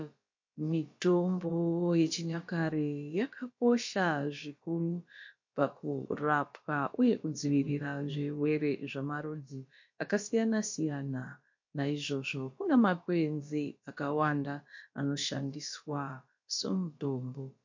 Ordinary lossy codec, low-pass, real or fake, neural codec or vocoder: MP3, 48 kbps; 7.2 kHz; fake; codec, 16 kHz, about 1 kbps, DyCAST, with the encoder's durations